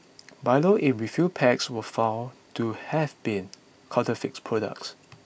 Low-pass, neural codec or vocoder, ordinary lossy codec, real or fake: none; none; none; real